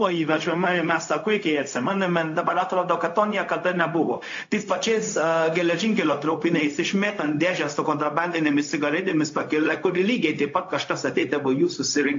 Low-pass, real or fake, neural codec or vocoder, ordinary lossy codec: 7.2 kHz; fake; codec, 16 kHz, 0.4 kbps, LongCat-Audio-Codec; AAC, 48 kbps